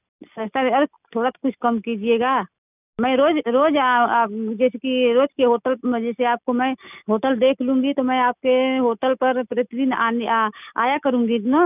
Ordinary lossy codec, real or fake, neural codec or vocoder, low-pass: none; real; none; 3.6 kHz